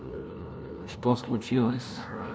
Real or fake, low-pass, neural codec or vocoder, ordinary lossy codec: fake; none; codec, 16 kHz, 0.5 kbps, FunCodec, trained on LibriTTS, 25 frames a second; none